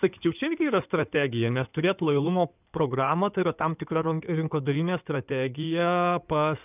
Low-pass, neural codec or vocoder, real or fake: 3.6 kHz; codec, 16 kHz in and 24 kHz out, 2.2 kbps, FireRedTTS-2 codec; fake